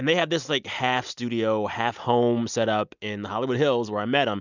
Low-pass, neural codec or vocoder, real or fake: 7.2 kHz; none; real